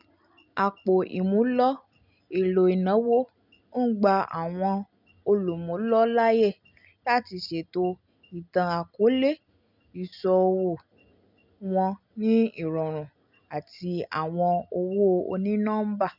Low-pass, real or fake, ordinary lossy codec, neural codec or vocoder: 5.4 kHz; real; none; none